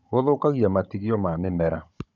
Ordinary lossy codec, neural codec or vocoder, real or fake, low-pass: none; codec, 16 kHz, 16 kbps, FunCodec, trained on Chinese and English, 50 frames a second; fake; 7.2 kHz